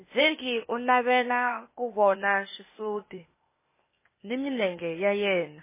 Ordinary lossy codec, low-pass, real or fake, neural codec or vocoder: MP3, 16 kbps; 3.6 kHz; fake; codec, 16 kHz, 0.8 kbps, ZipCodec